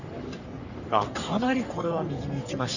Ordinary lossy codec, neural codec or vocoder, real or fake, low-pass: none; codec, 44.1 kHz, 3.4 kbps, Pupu-Codec; fake; 7.2 kHz